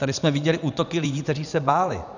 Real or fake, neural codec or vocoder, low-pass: fake; vocoder, 44.1 kHz, 80 mel bands, Vocos; 7.2 kHz